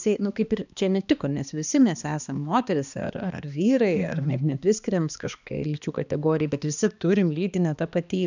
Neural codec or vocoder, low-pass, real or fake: codec, 16 kHz, 2 kbps, X-Codec, HuBERT features, trained on balanced general audio; 7.2 kHz; fake